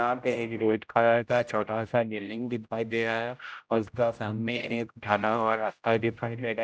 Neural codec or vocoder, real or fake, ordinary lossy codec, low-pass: codec, 16 kHz, 0.5 kbps, X-Codec, HuBERT features, trained on general audio; fake; none; none